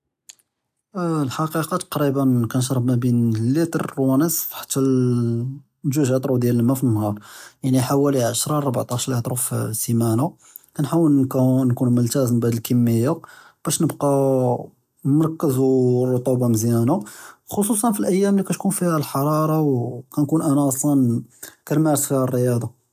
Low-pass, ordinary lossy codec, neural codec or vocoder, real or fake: 14.4 kHz; AAC, 96 kbps; none; real